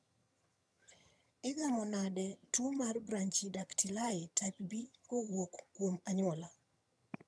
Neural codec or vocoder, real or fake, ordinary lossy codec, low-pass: vocoder, 22.05 kHz, 80 mel bands, HiFi-GAN; fake; none; none